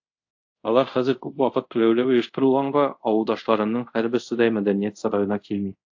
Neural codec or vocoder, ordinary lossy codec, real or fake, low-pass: codec, 24 kHz, 0.5 kbps, DualCodec; AAC, 48 kbps; fake; 7.2 kHz